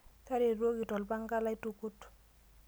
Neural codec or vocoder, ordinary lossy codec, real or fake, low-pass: none; none; real; none